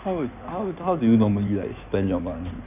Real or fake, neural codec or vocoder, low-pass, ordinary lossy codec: fake; codec, 16 kHz in and 24 kHz out, 2.2 kbps, FireRedTTS-2 codec; 3.6 kHz; none